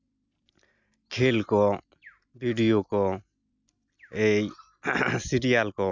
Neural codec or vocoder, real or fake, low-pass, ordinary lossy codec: none; real; 7.2 kHz; none